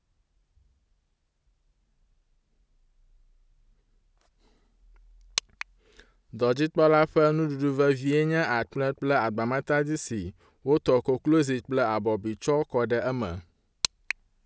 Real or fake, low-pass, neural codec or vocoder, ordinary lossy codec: real; none; none; none